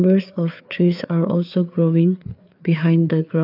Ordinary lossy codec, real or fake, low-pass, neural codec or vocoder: none; fake; 5.4 kHz; codec, 16 kHz, 4 kbps, FreqCodec, larger model